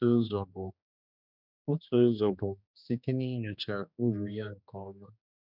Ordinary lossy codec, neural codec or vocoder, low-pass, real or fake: none; codec, 16 kHz, 2 kbps, X-Codec, HuBERT features, trained on general audio; 5.4 kHz; fake